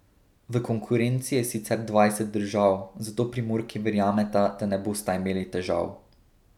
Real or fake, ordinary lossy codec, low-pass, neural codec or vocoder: real; none; 19.8 kHz; none